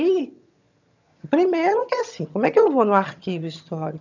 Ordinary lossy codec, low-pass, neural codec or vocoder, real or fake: none; 7.2 kHz; vocoder, 22.05 kHz, 80 mel bands, HiFi-GAN; fake